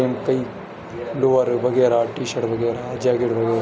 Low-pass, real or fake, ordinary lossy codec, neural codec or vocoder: none; real; none; none